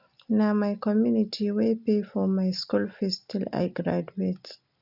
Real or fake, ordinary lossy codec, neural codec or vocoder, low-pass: real; none; none; 5.4 kHz